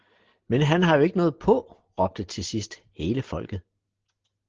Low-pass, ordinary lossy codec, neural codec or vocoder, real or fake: 7.2 kHz; Opus, 16 kbps; none; real